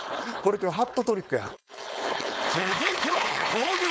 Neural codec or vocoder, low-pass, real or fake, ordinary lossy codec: codec, 16 kHz, 4.8 kbps, FACodec; none; fake; none